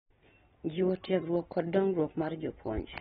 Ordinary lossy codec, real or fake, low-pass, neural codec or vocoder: AAC, 16 kbps; real; 7.2 kHz; none